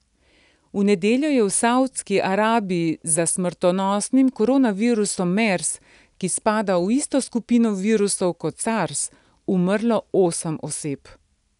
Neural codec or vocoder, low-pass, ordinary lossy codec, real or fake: none; 10.8 kHz; none; real